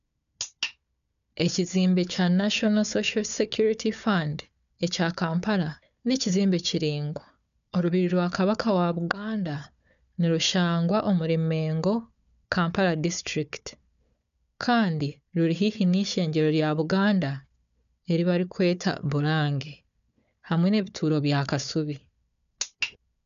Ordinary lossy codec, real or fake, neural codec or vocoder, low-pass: none; fake; codec, 16 kHz, 4 kbps, FunCodec, trained on Chinese and English, 50 frames a second; 7.2 kHz